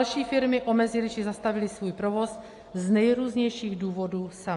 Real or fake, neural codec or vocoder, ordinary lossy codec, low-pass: real; none; AAC, 48 kbps; 10.8 kHz